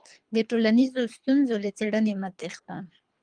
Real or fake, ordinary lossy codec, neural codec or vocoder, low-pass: fake; Opus, 32 kbps; codec, 24 kHz, 3 kbps, HILCodec; 9.9 kHz